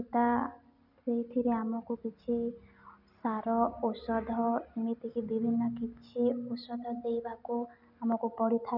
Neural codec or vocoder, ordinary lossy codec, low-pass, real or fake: none; none; 5.4 kHz; real